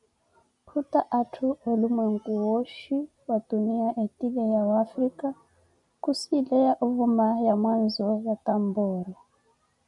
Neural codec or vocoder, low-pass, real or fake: none; 10.8 kHz; real